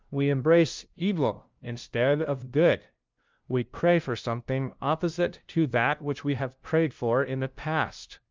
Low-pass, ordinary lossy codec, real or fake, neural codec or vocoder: 7.2 kHz; Opus, 24 kbps; fake; codec, 16 kHz, 0.5 kbps, FunCodec, trained on LibriTTS, 25 frames a second